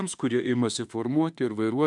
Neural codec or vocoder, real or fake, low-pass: autoencoder, 48 kHz, 32 numbers a frame, DAC-VAE, trained on Japanese speech; fake; 10.8 kHz